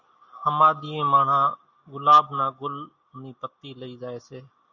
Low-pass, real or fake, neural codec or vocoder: 7.2 kHz; real; none